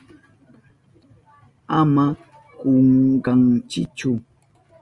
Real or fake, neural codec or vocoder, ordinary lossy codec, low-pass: real; none; Opus, 64 kbps; 10.8 kHz